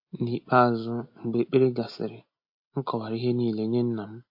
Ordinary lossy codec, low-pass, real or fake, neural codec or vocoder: MP3, 32 kbps; 5.4 kHz; real; none